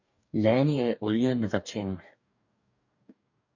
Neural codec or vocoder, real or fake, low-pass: codec, 44.1 kHz, 2.6 kbps, DAC; fake; 7.2 kHz